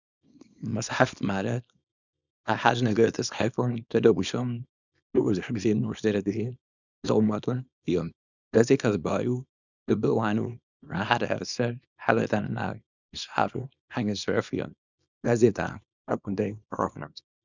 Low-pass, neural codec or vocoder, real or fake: 7.2 kHz; codec, 24 kHz, 0.9 kbps, WavTokenizer, small release; fake